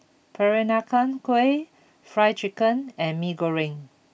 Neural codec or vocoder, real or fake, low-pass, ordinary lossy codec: none; real; none; none